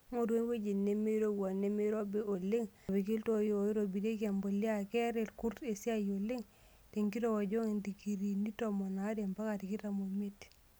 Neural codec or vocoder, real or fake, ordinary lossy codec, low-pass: none; real; none; none